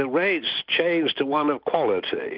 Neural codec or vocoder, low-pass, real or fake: none; 5.4 kHz; real